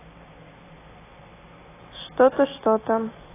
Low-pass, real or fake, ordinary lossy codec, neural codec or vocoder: 3.6 kHz; real; AAC, 16 kbps; none